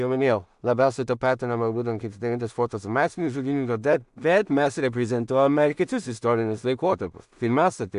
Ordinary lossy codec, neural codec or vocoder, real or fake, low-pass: AAC, 96 kbps; codec, 16 kHz in and 24 kHz out, 0.4 kbps, LongCat-Audio-Codec, two codebook decoder; fake; 10.8 kHz